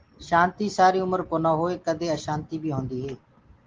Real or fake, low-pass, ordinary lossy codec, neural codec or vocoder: real; 7.2 kHz; Opus, 16 kbps; none